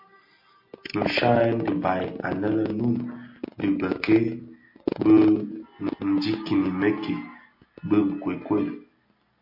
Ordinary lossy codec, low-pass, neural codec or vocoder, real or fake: MP3, 32 kbps; 5.4 kHz; none; real